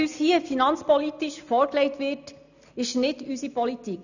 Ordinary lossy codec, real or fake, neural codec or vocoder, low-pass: none; real; none; 7.2 kHz